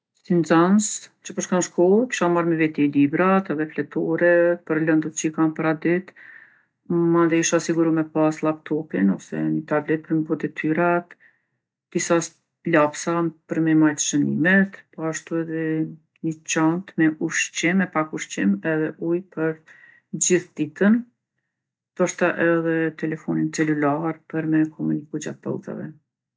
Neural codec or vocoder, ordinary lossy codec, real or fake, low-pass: none; none; real; none